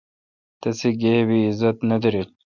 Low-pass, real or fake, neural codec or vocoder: 7.2 kHz; real; none